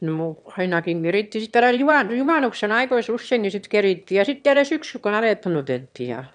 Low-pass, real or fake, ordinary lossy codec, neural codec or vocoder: 9.9 kHz; fake; none; autoencoder, 22.05 kHz, a latent of 192 numbers a frame, VITS, trained on one speaker